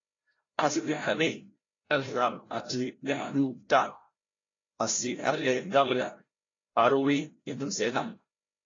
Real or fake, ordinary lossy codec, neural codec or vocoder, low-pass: fake; AAC, 32 kbps; codec, 16 kHz, 0.5 kbps, FreqCodec, larger model; 7.2 kHz